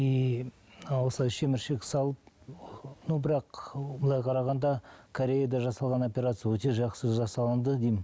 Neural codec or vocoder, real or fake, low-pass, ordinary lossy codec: none; real; none; none